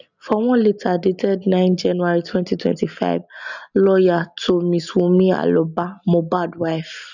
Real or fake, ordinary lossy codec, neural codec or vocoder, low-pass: real; none; none; 7.2 kHz